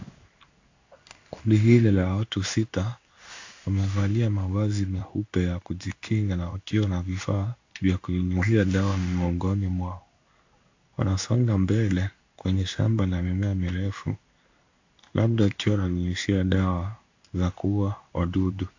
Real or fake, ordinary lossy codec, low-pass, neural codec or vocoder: fake; AAC, 48 kbps; 7.2 kHz; codec, 16 kHz in and 24 kHz out, 1 kbps, XY-Tokenizer